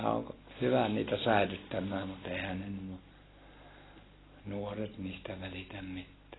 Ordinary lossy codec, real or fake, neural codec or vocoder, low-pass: AAC, 16 kbps; real; none; 7.2 kHz